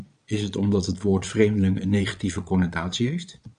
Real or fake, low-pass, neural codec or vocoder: fake; 9.9 kHz; vocoder, 22.05 kHz, 80 mel bands, Vocos